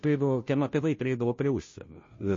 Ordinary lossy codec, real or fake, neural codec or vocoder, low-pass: MP3, 32 kbps; fake; codec, 16 kHz, 0.5 kbps, FunCodec, trained on Chinese and English, 25 frames a second; 7.2 kHz